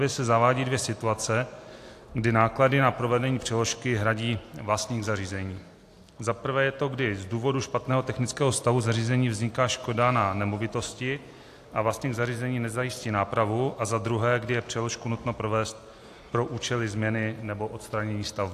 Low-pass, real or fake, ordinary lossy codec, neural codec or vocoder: 14.4 kHz; real; AAC, 64 kbps; none